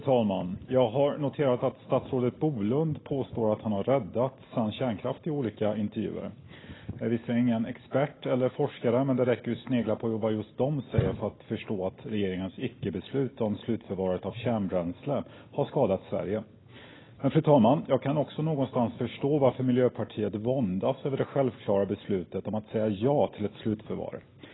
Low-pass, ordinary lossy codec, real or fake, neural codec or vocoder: 7.2 kHz; AAC, 16 kbps; real; none